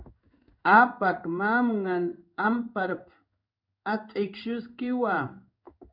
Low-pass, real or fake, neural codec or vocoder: 5.4 kHz; fake; codec, 16 kHz in and 24 kHz out, 1 kbps, XY-Tokenizer